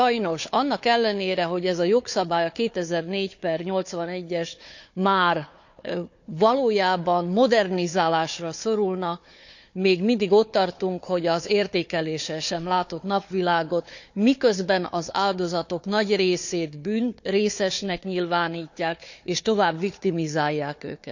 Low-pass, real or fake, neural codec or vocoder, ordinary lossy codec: 7.2 kHz; fake; codec, 16 kHz, 4 kbps, FunCodec, trained on Chinese and English, 50 frames a second; none